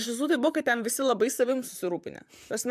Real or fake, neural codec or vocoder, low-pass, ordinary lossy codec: fake; vocoder, 44.1 kHz, 128 mel bands, Pupu-Vocoder; 14.4 kHz; MP3, 96 kbps